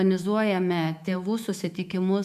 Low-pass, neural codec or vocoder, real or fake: 14.4 kHz; autoencoder, 48 kHz, 128 numbers a frame, DAC-VAE, trained on Japanese speech; fake